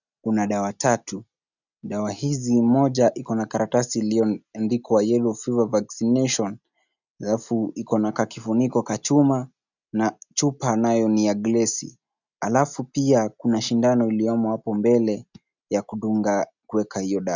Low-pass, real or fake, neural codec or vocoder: 7.2 kHz; real; none